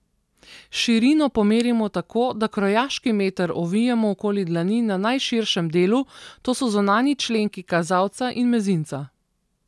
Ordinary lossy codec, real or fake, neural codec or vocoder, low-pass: none; real; none; none